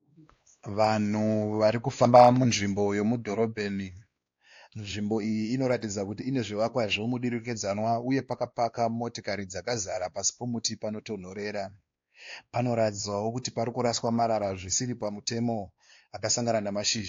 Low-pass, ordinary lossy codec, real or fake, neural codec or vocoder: 7.2 kHz; AAC, 48 kbps; fake; codec, 16 kHz, 2 kbps, X-Codec, WavLM features, trained on Multilingual LibriSpeech